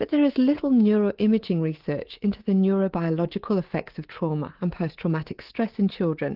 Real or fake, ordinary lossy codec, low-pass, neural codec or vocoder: fake; Opus, 16 kbps; 5.4 kHz; codec, 24 kHz, 3.1 kbps, DualCodec